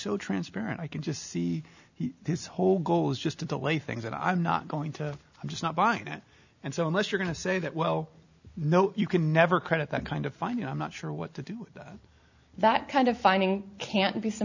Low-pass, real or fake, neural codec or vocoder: 7.2 kHz; real; none